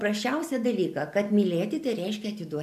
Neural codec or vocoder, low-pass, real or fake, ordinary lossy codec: none; 14.4 kHz; real; MP3, 96 kbps